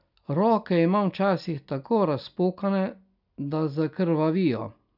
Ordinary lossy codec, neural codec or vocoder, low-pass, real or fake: none; none; 5.4 kHz; real